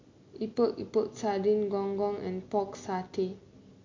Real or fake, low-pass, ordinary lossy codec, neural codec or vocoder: real; 7.2 kHz; MP3, 48 kbps; none